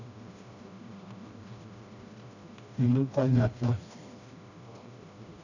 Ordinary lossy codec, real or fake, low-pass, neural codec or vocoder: none; fake; 7.2 kHz; codec, 16 kHz, 1 kbps, FreqCodec, smaller model